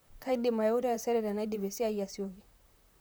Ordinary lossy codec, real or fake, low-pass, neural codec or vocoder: none; fake; none; vocoder, 44.1 kHz, 128 mel bands, Pupu-Vocoder